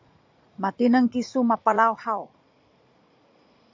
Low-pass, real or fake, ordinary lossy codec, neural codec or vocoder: 7.2 kHz; fake; MP3, 48 kbps; vocoder, 44.1 kHz, 80 mel bands, Vocos